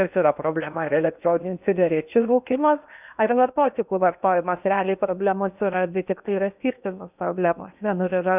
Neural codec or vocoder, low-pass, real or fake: codec, 16 kHz in and 24 kHz out, 0.8 kbps, FocalCodec, streaming, 65536 codes; 3.6 kHz; fake